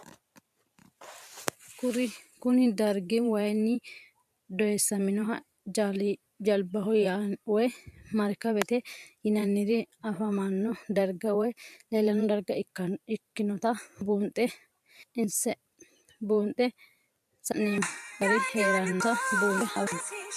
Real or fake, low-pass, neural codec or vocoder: fake; 14.4 kHz; vocoder, 44.1 kHz, 128 mel bands every 512 samples, BigVGAN v2